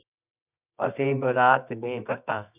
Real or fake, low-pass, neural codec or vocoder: fake; 3.6 kHz; codec, 24 kHz, 0.9 kbps, WavTokenizer, medium music audio release